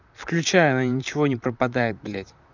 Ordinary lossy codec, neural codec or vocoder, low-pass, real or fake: none; vocoder, 44.1 kHz, 128 mel bands, Pupu-Vocoder; 7.2 kHz; fake